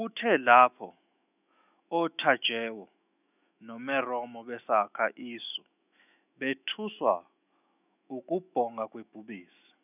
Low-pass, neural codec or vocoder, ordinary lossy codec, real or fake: 3.6 kHz; autoencoder, 48 kHz, 128 numbers a frame, DAC-VAE, trained on Japanese speech; none; fake